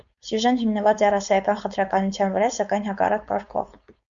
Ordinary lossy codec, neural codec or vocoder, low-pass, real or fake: Opus, 64 kbps; codec, 16 kHz, 4.8 kbps, FACodec; 7.2 kHz; fake